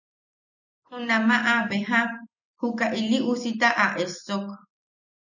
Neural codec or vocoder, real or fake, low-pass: none; real; 7.2 kHz